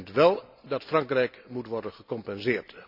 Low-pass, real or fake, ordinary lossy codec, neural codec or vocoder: 5.4 kHz; real; none; none